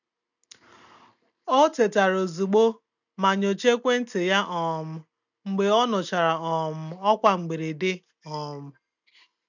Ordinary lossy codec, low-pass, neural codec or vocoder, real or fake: none; 7.2 kHz; none; real